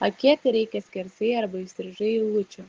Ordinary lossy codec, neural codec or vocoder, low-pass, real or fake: Opus, 16 kbps; none; 7.2 kHz; real